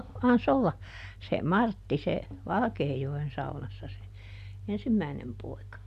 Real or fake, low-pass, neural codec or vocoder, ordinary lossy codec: real; 14.4 kHz; none; none